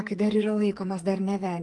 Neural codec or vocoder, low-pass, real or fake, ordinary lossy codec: codec, 44.1 kHz, 2.6 kbps, SNAC; 10.8 kHz; fake; Opus, 24 kbps